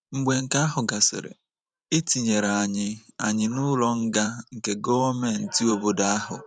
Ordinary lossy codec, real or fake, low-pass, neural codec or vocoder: none; fake; 9.9 kHz; vocoder, 44.1 kHz, 128 mel bands every 512 samples, BigVGAN v2